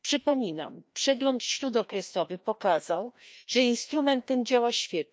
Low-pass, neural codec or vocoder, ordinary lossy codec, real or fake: none; codec, 16 kHz, 1 kbps, FreqCodec, larger model; none; fake